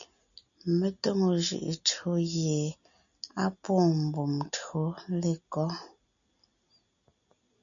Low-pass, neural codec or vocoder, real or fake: 7.2 kHz; none; real